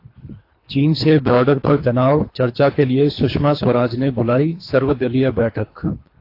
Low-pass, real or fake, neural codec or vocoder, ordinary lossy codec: 5.4 kHz; fake; codec, 24 kHz, 3 kbps, HILCodec; AAC, 32 kbps